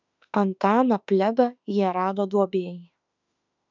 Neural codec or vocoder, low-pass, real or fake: autoencoder, 48 kHz, 32 numbers a frame, DAC-VAE, trained on Japanese speech; 7.2 kHz; fake